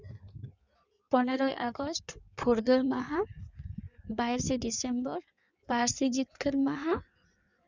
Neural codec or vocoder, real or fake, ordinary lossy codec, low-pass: codec, 16 kHz in and 24 kHz out, 1.1 kbps, FireRedTTS-2 codec; fake; none; 7.2 kHz